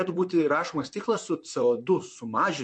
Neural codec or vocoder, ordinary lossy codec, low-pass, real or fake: vocoder, 44.1 kHz, 128 mel bands, Pupu-Vocoder; MP3, 48 kbps; 9.9 kHz; fake